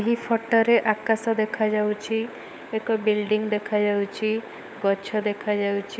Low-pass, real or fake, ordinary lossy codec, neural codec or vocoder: none; fake; none; codec, 16 kHz, 16 kbps, FunCodec, trained on LibriTTS, 50 frames a second